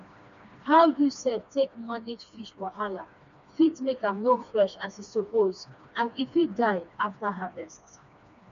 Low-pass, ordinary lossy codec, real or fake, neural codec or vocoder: 7.2 kHz; none; fake; codec, 16 kHz, 2 kbps, FreqCodec, smaller model